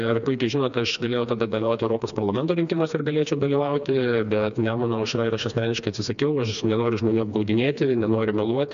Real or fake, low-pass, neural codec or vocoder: fake; 7.2 kHz; codec, 16 kHz, 2 kbps, FreqCodec, smaller model